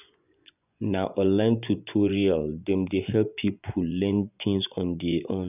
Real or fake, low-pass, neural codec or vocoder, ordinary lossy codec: fake; 3.6 kHz; vocoder, 24 kHz, 100 mel bands, Vocos; none